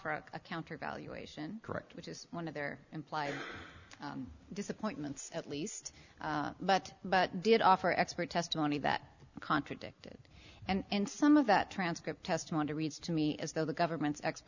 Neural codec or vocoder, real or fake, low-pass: none; real; 7.2 kHz